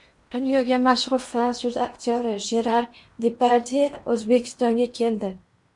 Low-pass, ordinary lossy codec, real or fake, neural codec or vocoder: 10.8 kHz; MP3, 64 kbps; fake; codec, 16 kHz in and 24 kHz out, 0.8 kbps, FocalCodec, streaming, 65536 codes